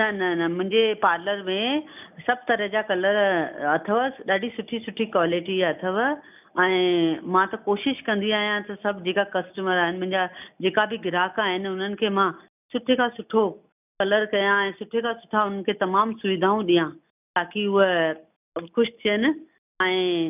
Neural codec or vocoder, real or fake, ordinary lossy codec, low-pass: none; real; none; 3.6 kHz